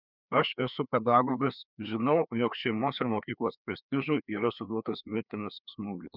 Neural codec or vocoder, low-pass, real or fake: codec, 16 kHz, 2 kbps, FreqCodec, larger model; 5.4 kHz; fake